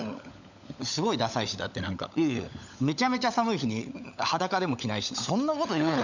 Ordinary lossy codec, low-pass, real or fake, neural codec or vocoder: none; 7.2 kHz; fake; codec, 16 kHz, 16 kbps, FunCodec, trained on LibriTTS, 50 frames a second